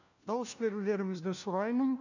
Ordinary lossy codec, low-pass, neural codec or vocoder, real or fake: none; 7.2 kHz; codec, 16 kHz, 1 kbps, FunCodec, trained on LibriTTS, 50 frames a second; fake